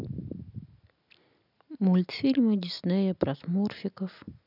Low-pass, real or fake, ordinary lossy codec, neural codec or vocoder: 5.4 kHz; real; none; none